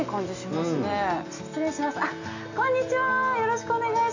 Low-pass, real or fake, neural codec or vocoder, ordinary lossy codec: 7.2 kHz; real; none; AAC, 48 kbps